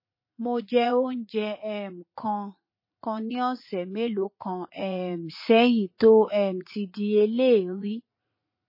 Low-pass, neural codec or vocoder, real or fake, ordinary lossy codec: 5.4 kHz; vocoder, 44.1 kHz, 80 mel bands, Vocos; fake; MP3, 24 kbps